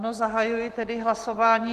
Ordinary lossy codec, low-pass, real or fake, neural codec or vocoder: Opus, 16 kbps; 14.4 kHz; real; none